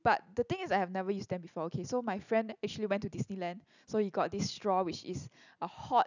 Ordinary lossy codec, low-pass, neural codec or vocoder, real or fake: none; 7.2 kHz; none; real